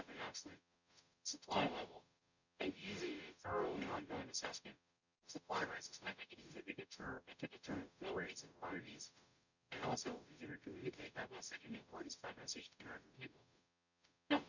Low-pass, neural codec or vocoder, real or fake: 7.2 kHz; codec, 44.1 kHz, 0.9 kbps, DAC; fake